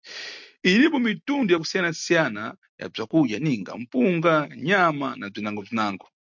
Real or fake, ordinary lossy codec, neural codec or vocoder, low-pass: real; MP3, 64 kbps; none; 7.2 kHz